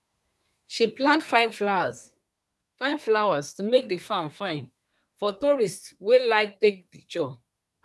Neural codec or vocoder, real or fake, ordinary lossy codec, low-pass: codec, 24 kHz, 1 kbps, SNAC; fake; none; none